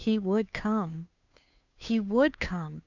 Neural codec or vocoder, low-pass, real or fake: codec, 16 kHz, 2 kbps, FunCodec, trained on Chinese and English, 25 frames a second; 7.2 kHz; fake